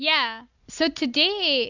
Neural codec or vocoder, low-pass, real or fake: none; 7.2 kHz; real